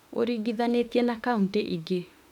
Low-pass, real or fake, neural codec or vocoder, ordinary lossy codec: 19.8 kHz; fake; autoencoder, 48 kHz, 32 numbers a frame, DAC-VAE, trained on Japanese speech; none